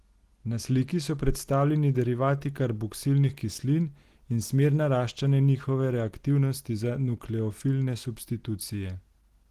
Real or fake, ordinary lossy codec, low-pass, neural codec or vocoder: real; Opus, 16 kbps; 14.4 kHz; none